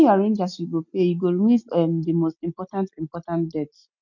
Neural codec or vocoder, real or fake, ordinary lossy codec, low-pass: none; real; none; 7.2 kHz